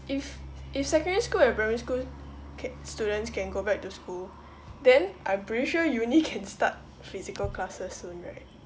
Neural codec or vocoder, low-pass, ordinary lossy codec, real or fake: none; none; none; real